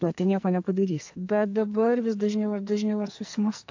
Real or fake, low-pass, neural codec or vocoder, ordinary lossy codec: fake; 7.2 kHz; codec, 44.1 kHz, 2.6 kbps, SNAC; AAC, 48 kbps